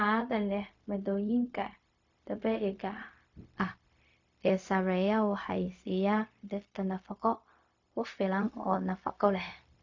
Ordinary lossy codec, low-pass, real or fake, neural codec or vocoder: AAC, 48 kbps; 7.2 kHz; fake; codec, 16 kHz, 0.4 kbps, LongCat-Audio-Codec